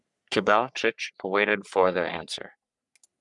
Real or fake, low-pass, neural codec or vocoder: fake; 10.8 kHz; codec, 44.1 kHz, 3.4 kbps, Pupu-Codec